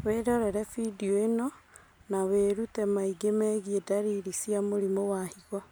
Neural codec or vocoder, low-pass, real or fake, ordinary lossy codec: none; none; real; none